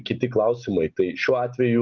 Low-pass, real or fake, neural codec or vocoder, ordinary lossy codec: 7.2 kHz; real; none; Opus, 24 kbps